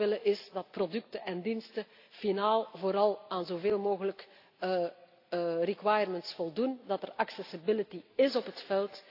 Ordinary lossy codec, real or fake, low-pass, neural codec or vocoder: AAC, 32 kbps; real; 5.4 kHz; none